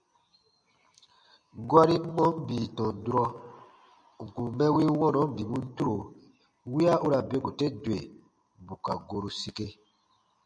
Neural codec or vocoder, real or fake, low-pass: none; real; 9.9 kHz